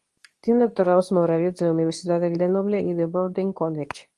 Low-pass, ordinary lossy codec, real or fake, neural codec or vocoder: 10.8 kHz; Opus, 24 kbps; fake; codec, 24 kHz, 0.9 kbps, WavTokenizer, medium speech release version 2